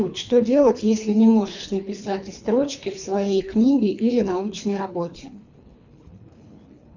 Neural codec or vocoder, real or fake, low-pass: codec, 24 kHz, 3 kbps, HILCodec; fake; 7.2 kHz